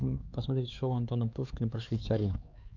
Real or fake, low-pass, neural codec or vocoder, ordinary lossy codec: fake; 7.2 kHz; codec, 16 kHz, 4 kbps, X-Codec, HuBERT features, trained on LibriSpeech; Opus, 64 kbps